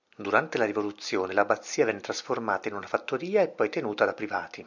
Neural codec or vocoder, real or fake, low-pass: none; real; 7.2 kHz